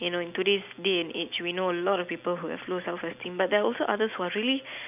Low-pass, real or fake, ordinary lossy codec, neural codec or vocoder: 3.6 kHz; real; none; none